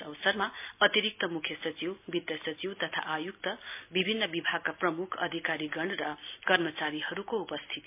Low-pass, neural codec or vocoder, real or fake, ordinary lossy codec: 3.6 kHz; none; real; MP3, 32 kbps